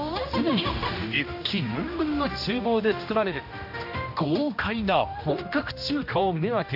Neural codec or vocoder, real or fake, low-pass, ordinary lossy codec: codec, 16 kHz, 1 kbps, X-Codec, HuBERT features, trained on general audio; fake; 5.4 kHz; AAC, 48 kbps